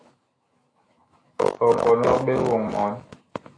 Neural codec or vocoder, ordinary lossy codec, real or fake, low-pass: autoencoder, 48 kHz, 128 numbers a frame, DAC-VAE, trained on Japanese speech; AAC, 32 kbps; fake; 9.9 kHz